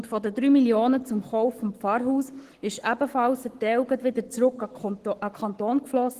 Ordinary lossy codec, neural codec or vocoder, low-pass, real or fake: Opus, 16 kbps; codec, 44.1 kHz, 7.8 kbps, Pupu-Codec; 14.4 kHz; fake